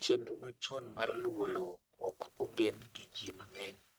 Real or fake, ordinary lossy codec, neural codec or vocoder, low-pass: fake; none; codec, 44.1 kHz, 1.7 kbps, Pupu-Codec; none